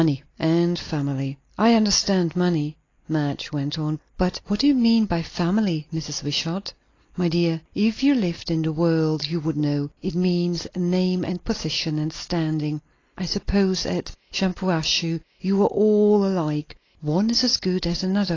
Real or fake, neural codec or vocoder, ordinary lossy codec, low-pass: real; none; AAC, 32 kbps; 7.2 kHz